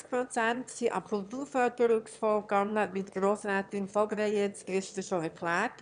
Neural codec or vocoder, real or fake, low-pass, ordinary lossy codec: autoencoder, 22.05 kHz, a latent of 192 numbers a frame, VITS, trained on one speaker; fake; 9.9 kHz; none